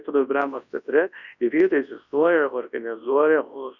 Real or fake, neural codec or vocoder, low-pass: fake; codec, 24 kHz, 0.9 kbps, WavTokenizer, large speech release; 7.2 kHz